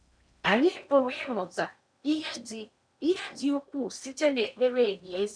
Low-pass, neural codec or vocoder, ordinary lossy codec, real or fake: 9.9 kHz; codec, 16 kHz in and 24 kHz out, 0.8 kbps, FocalCodec, streaming, 65536 codes; none; fake